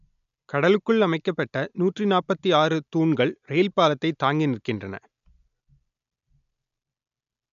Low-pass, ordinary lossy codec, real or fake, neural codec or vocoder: 7.2 kHz; none; real; none